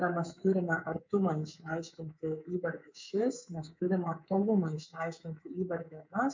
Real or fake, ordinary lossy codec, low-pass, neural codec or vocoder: fake; MP3, 64 kbps; 7.2 kHz; codec, 24 kHz, 3.1 kbps, DualCodec